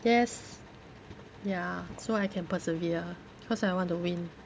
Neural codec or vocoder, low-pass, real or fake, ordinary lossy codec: none; none; real; none